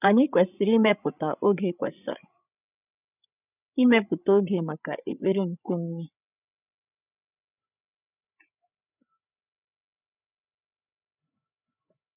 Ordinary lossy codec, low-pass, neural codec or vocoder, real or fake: none; 3.6 kHz; codec, 16 kHz, 8 kbps, FreqCodec, larger model; fake